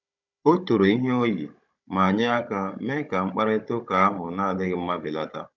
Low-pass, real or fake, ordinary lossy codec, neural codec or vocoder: 7.2 kHz; fake; none; codec, 16 kHz, 16 kbps, FunCodec, trained on Chinese and English, 50 frames a second